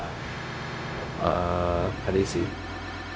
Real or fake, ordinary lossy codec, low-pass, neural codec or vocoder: fake; none; none; codec, 16 kHz, 0.4 kbps, LongCat-Audio-Codec